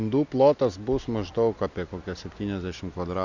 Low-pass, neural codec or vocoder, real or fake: 7.2 kHz; none; real